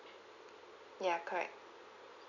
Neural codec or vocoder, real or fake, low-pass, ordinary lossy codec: none; real; 7.2 kHz; none